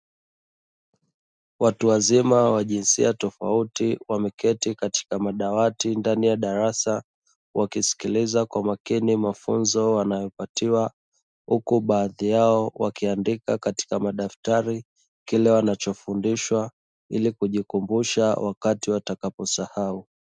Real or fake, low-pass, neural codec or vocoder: real; 9.9 kHz; none